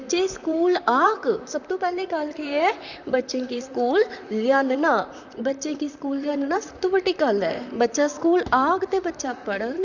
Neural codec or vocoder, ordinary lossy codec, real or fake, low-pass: vocoder, 44.1 kHz, 128 mel bands, Pupu-Vocoder; none; fake; 7.2 kHz